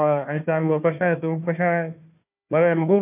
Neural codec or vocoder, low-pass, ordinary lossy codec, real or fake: codec, 16 kHz, 1 kbps, FunCodec, trained on Chinese and English, 50 frames a second; 3.6 kHz; none; fake